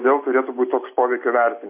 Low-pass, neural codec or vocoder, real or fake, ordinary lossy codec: 3.6 kHz; none; real; MP3, 24 kbps